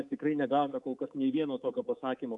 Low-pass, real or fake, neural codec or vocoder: 10.8 kHz; fake; codec, 24 kHz, 3.1 kbps, DualCodec